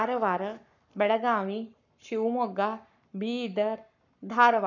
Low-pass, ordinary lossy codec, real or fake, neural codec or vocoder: 7.2 kHz; none; fake; codec, 44.1 kHz, 7.8 kbps, Pupu-Codec